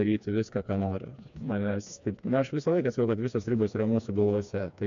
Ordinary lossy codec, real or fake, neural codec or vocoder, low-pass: MP3, 64 kbps; fake; codec, 16 kHz, 2 kbps, FreqCodec, smaller model; 7.2 kHz